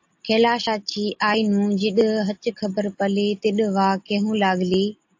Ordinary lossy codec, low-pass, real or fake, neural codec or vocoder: AAC, 48 kbps; 7.2 kHz; real; none